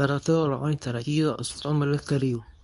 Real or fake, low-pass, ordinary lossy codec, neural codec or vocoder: fake; 10.8 kHz; none; codec, 24 kHz, 0.9 kbps, WavTokenizer, medium speech release version 2